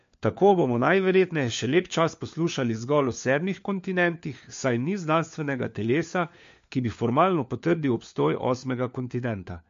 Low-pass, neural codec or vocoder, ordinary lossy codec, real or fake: 7.2 kHz; codec, 16 kHz, 4 kbps, FunCodec, trained on LibriTTS, 50 frames a second; MP3, 48 kbps; fake